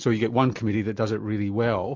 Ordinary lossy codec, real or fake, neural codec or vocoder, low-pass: MP3, 64 kbps; real; none; 7.2 kHz